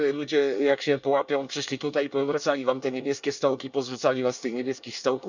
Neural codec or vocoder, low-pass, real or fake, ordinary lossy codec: codec, 24 kHz, 1 kbps, SNAC; 7.2 kHz; fake; none